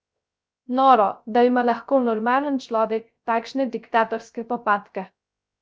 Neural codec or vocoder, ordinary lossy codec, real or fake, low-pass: codec, 16 kHz, 0.3 kbps, FocalCodec; none; fake; none